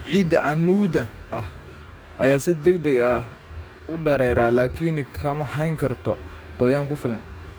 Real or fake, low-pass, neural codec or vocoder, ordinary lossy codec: fake; none; codec, 44.1 kHz, 2.6 kbps, DAC; none